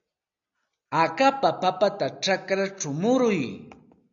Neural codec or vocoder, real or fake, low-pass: none; real; 7.2 kHz